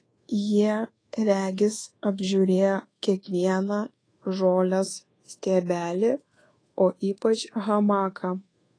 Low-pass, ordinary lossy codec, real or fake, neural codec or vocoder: 9.9 kHz; AAC, 32 kbps; fake; codec, 24 kHz, 1.2 kbps, DualCodec